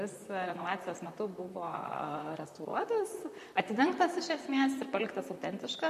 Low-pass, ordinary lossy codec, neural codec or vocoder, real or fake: 14.4 kHz; MP3, 64 kbps; vocoder, 44.1 kHz, 128 mel bands, Pupu-Vocoder; fake